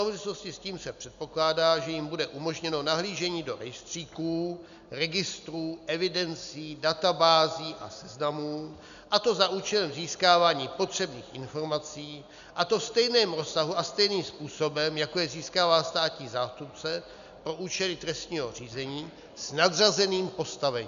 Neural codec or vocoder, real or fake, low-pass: none; real; 7.2 kHz